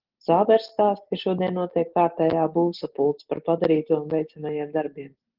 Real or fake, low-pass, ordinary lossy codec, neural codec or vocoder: real; 5.4 kHz; Opus, 16 kbps; none